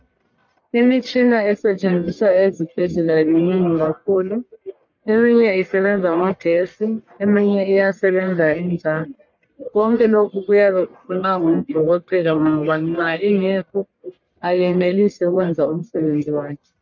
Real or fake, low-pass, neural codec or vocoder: fake; 7.2 kHz; codec, 44.1 kHz, 1.7 kbps, Pupu-Codec